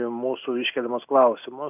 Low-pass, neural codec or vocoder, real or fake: 3.6 kHz; none; real